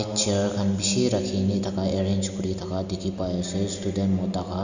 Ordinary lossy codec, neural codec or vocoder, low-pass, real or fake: none; none; 7.2 kHz; real